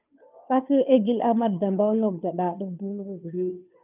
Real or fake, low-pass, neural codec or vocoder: fake; 3.6 kHz; codec, 16 kHz, 2 kbps, FunCodec, trained on Chinese and English, 25 frames a second